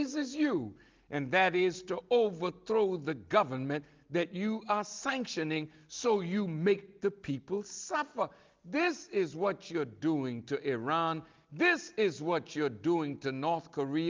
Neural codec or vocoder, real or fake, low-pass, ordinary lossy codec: none; real; 7.2 kHz; Opus, 32 kbps